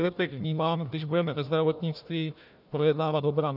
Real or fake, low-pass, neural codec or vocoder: fake; 5.4 kHz; codec, 16 kHz, 1 kbps, FunCodec, trained on Chinese and English, 50 frames a second